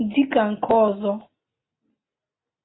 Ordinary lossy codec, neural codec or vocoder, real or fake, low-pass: AAC, 16 kbps; none; real; 7.2 kHz